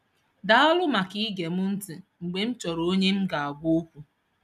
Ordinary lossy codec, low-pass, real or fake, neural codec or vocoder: none; 14.4 kHz; real; none